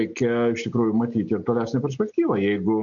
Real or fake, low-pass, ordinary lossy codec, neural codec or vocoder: real; 7.2 kHz; MP3, 48 kbps; none